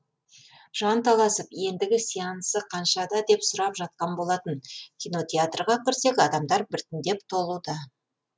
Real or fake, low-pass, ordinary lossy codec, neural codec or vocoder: real; none; none; none